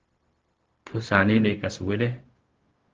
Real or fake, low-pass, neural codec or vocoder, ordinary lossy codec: fake; 7.2 kHz; codec, 16 kHz, 0.4 kbps, LongCat-Audio-Codec; Opus, 16 kbps